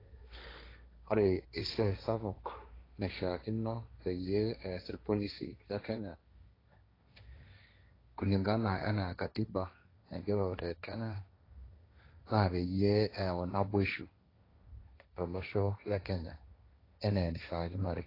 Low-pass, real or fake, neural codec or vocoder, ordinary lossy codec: 5.4 kHz; fake; codec, 16 kHz, 1.1 kbps, Voila-Tokenizer; AAC, 24 kbps